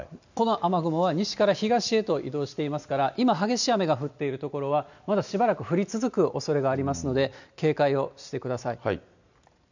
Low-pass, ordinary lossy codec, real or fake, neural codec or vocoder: 7.2 kHz; none; real; none